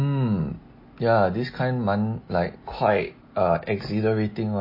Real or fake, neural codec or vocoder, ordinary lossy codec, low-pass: real; none; MP3, 24 kbps; 5.4 kHz